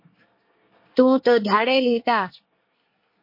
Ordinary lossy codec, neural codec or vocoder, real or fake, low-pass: MP3, 32 kbps; codec, 24 kHz, 1 kbps, SNAC; fake; 5.4 kHz